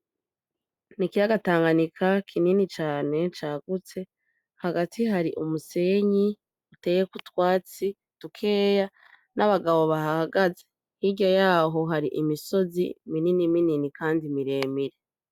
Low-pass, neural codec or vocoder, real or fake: 19.8 kHz; none; real